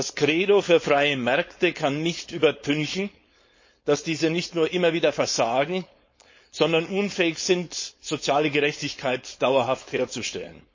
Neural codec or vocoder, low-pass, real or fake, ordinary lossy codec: codec, 16 kHz, 4.8 kbps, FACodec; 7.2 kHz; fake; MP3, 32 kbps